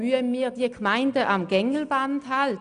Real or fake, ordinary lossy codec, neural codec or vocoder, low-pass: real; none; none; 9.9 kHz